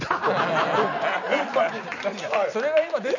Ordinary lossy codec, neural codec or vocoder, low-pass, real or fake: none; none; 7.2 kHz; real